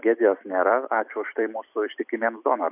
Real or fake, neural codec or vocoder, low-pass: fake; vocoder, 44.1 kHz, 128 mel bands every 256 samples, BigVGAN v2; 3.6 kHz